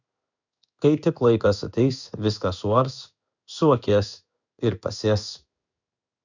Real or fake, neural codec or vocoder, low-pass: fake; codec, 16 kHz in and 24 kHz out, 1 kbps, XY-Tokenizer; 7.2 kHz